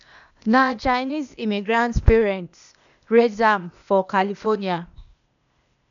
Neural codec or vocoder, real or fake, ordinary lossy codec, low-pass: codec, 16 kHz, 0.8 kbps, ZipCodec; fake; none; 7.2 kHz